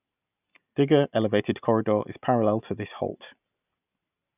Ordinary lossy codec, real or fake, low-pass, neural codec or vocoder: none; real; 3.6 kHz; none